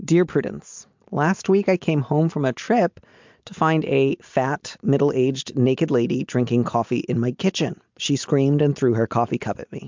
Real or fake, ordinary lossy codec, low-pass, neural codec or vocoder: real; MP3, 64 kbps; 7.2 kHz; none